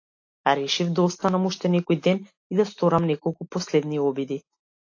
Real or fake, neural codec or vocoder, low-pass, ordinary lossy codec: real; none; 7.2 kHz; AAC, 48 kbps